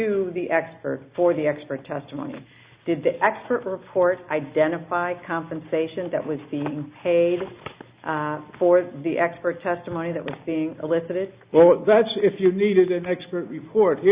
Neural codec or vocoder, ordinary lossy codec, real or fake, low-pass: none; Opus, 64 kbps; real; 3.6 kHz